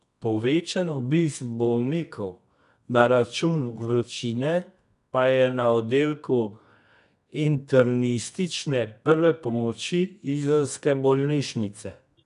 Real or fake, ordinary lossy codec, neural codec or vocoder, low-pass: fake; none; codec, 24 kHz, 0.9 kbps, WavTokenizer, medium music audio release; 10.8 kHz